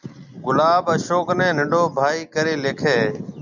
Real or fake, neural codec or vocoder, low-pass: real; none; 7.2 kHz